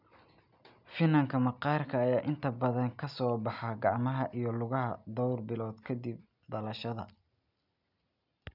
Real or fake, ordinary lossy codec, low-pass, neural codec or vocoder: real; none; 5.4 kHz; none